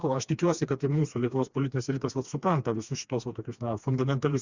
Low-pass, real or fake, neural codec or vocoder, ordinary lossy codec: 7.2 kHz; fake; codec, 16 kHz, 2 kbps, FreqCodec, smaller model; Opus, 64 kbps